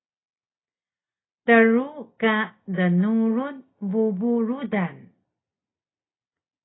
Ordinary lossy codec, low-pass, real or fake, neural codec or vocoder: AAC, 16 kbps; 7.2 kHz; real; none